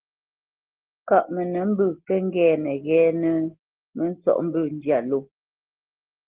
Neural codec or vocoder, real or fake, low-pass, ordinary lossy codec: none; real; 3.6 kHz; Opus, 16 kbps